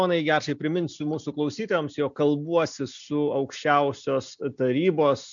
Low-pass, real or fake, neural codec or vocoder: 7.2 kHz; real; none